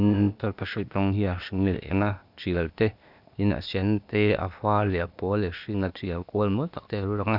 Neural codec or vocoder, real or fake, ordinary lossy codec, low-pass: codec, 16 kHz, 0.8 kbps, ZipCodec; fake; none; 5.4 kHz